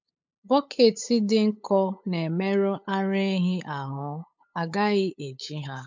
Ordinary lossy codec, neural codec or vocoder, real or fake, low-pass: MP3, 64 kbps; codec, 16 kHz, 8 kbps, FunCodec, trained on LibriTTS, 25 frames a second; fake; 7.2 kHz